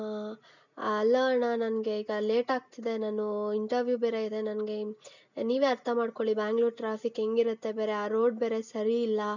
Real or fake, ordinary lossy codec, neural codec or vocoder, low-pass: real; none; none; 7.2 kHz